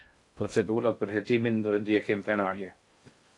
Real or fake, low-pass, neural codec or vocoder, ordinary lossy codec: fake; 10.8 kHz; codec, 16 kHz in and 24 kHz out, 0.6 kbps, FocalCodec, streaming, 2048 codes; AAC, 48 kbps